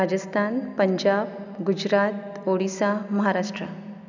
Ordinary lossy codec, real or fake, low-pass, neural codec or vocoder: none; real; 7.2 kHz; none